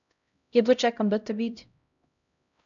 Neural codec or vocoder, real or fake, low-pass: codec, 16 kHz, 0.5 kbps, X-Codec, HuBERT features, trained on LibriSpeech; fake; 7.2 kHz